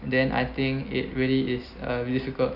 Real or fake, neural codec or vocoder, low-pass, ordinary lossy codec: real; none; 5.4 kHz; none